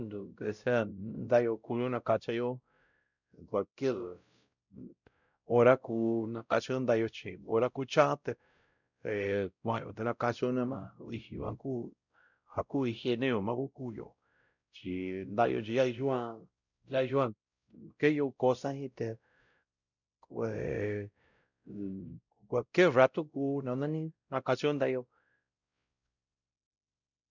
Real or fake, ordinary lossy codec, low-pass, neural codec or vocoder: fake; none; 7.2 kHz; codec, 16 kHz, 0.5 kbps, X-Codec, WavLM features, trained on Multilingual LibriSpeech